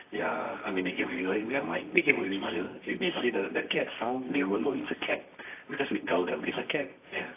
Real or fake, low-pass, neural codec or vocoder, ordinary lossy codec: fake; 3.6 kHz; codec, 24 kHz, 0.9 kbps, WavTokenizer, medium music audio release; AAC, 24 kbps